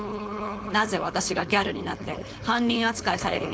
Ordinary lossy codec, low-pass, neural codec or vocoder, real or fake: none; none; codec, 16 kHz, 4.8 kbps, FACodec; fake